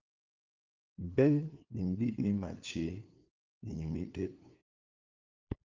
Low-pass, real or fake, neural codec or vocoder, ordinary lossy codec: 7.2 kHz; fake; codec, 16 kHz, 2 kbps, FunCodec, trained on LibriTTS, 25 frames a second; Opus, 32 kbps